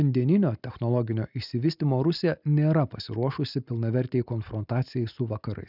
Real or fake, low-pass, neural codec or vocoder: real; 5.4 kHz; none